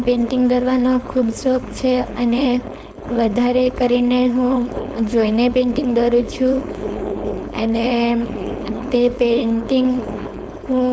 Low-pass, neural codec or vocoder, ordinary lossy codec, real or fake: none; codec, 16 kHz, 4.8 kbps, FACodec; none; fake